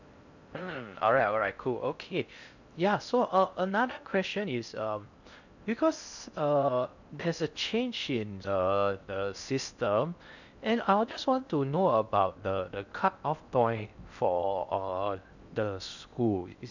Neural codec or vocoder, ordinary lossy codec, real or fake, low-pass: codec, 16 kHz in and 24 kHz out, 0.6 kbps, FocalCodec, streaming, 4096 codes; none; fake; 7.2 kHz